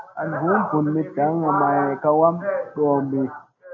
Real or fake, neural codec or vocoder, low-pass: real; none; 7.2 kHz